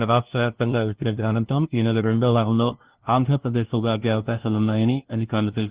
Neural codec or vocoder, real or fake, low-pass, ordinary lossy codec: codec, 16 kHz, 0.5 kbps, FunCodec, trained on LibriTTS, 25 frames a second; fake; 3.6 kHz; Opus, 16 kbps